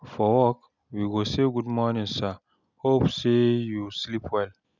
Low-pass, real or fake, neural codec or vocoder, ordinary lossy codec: 7.2 kHz; real; none; none